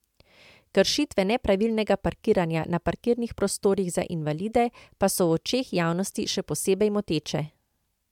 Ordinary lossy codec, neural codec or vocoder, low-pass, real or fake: MP3, 96 kbps; none; 19.8 kHz; real